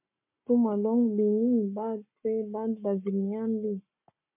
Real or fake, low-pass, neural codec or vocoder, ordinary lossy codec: fake; 3.6 kHz; codec, 44.1 kHz, 7.8 kbps, Pupu-Codec; MP3, 32 kbps